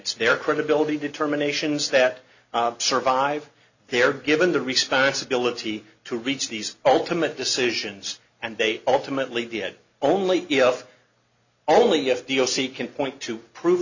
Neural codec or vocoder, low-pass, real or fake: none; 7.2 kHz; real